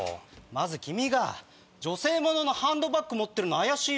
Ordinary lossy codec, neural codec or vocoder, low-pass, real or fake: none; none; none; real